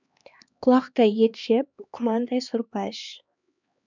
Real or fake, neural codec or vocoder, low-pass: fake; codec, 16 kHz, 2 kbps, X-Codec, HuBERT features, trained on LibriSpeech; 7.2 kHz